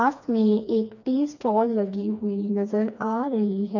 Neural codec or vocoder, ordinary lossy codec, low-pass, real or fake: codec, 16 kHz, 2 kbps, FreqCodec, smaller model; none; 7.2 kHz; fake